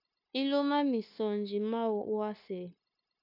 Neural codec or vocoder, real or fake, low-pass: codec, 16 kHz, 0.9 kbps, LongCat-Audio-Codec; fake; 5.4 kHz